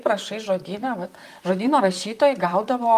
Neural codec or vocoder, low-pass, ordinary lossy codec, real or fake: vocoder, 44.1 kHz, 128 mel bands, Pupu-Vocoder; 14.4 kHz; Opus, 32 kbps; fake